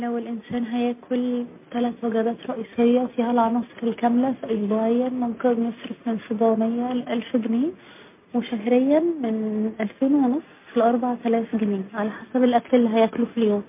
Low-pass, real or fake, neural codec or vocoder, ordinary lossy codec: 3.6 kHz; real; none; MP3, 24 kbps